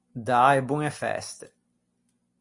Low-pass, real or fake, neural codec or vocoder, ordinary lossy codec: 10.8 kHz; real; none; Opus, 64 kbps